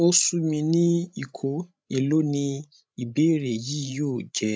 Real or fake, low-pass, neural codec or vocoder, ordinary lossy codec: fake; none; codec, 16 kHz, 16 kbps, FreqCodec, larger model; none